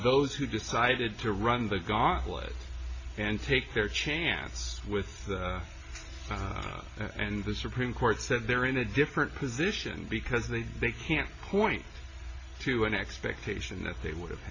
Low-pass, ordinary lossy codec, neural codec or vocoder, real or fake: 7.2 kHz; MP3, 32 kbps; none; real